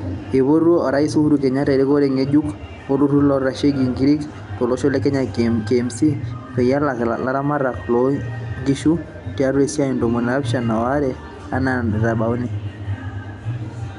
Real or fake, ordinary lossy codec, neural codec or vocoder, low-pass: real; none; none; 10.8 kHz